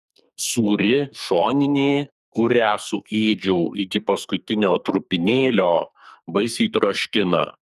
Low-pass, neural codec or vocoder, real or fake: 14.4 kHz; codec, 44.1 kHz, 2.6 kbps, SNAC; fake